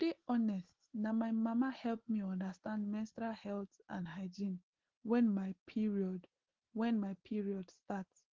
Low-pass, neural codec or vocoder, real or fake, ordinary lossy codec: 7.2 kHz; none; real; Opus, 16 kbps